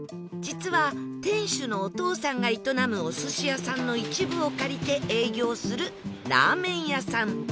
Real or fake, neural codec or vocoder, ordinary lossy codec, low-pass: real; none; none; none